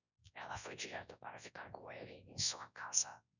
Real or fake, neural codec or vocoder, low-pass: fake; codec, 24 kHz, 0.9 kbps, WavTokenizer, large speech release; 7.2 kHz